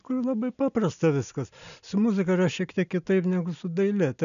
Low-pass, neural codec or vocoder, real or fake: 7.2 kHz; none; real